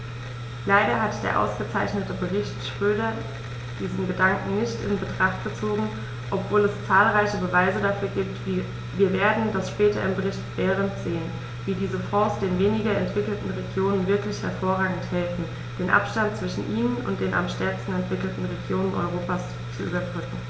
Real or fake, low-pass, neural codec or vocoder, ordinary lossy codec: real; none; none; none